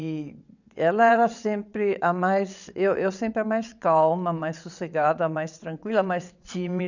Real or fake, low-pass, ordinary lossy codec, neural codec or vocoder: fake; 7.2 kHz; none; vocoder, 22.05 kHz, 80 mel bands, WaveNeXt